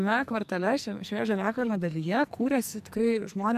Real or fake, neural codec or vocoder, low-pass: fake; codec, 44.1 kHz, 2.6 kbps, SNAC; 14.4 kHz